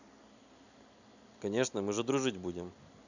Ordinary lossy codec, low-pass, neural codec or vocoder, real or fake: none; 7.2 kHz; none; real